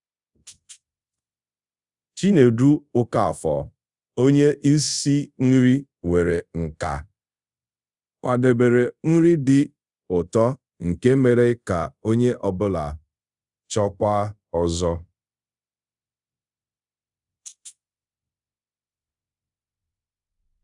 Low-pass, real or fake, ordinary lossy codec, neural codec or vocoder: 10.8 kHz; fake; Opus, 64 kbps; codec, 24 kHz, 0.5 kbps, DualCodec